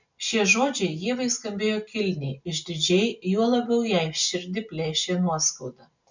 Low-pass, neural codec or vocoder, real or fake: 7.2 kHz; none; real